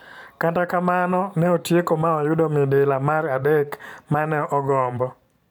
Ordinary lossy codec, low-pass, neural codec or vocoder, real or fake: none; 19.8 kHz; vocoder, 44.1 kHz, 128 mel bands every 512 samples, BigVGAN v2; fake